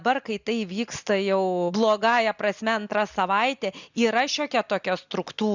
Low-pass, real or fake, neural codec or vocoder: 7.2 kHz; real; none